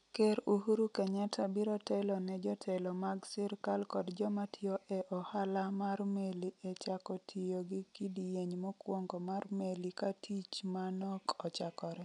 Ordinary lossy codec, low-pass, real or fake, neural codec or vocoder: none; 10.8 kHz; real; none